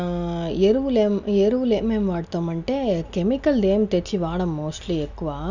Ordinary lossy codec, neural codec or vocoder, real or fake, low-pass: none; none; real; 7.2 kHz